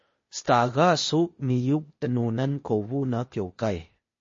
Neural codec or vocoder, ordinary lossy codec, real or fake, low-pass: codec, 16 kHz, 0.8 kbps, ZipCodec; MP3, 32 kbps; fake; 7.2 kHz